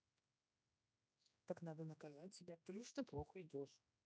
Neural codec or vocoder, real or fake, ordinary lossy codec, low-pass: codec, 16 kHz, 1 kbps, X-Codec, HuBERT features, trained on general audio; fake; none; none